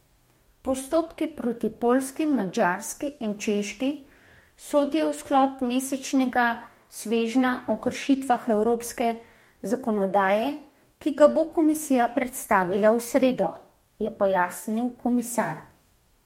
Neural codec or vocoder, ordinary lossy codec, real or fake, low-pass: codec, 44.1 kHz, 2.6 kbps, DAC; MP3, 64 kbps; fake; 19.8 kHz